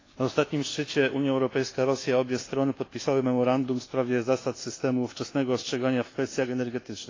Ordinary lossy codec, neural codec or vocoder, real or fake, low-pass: AAC, 32 kbps; codec, 24 kHz, 1.2 kbps, DualCodec; fake; 7.2 kHz